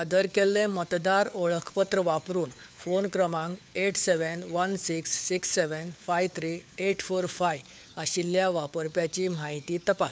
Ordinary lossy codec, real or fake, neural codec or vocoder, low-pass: none; fake; codec, 16 kHz, 4 kbps, FunCodec, trained on LibriTTS, 50 frames a second; none